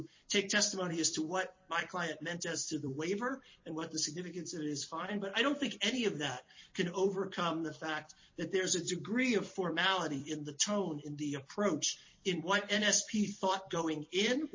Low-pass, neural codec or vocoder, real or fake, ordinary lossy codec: 7.2 kHz; vocoder, 44.1 kHz, 128 mel bands every 256 samples, BigVGAN v2; fake; MP3, 32 kbps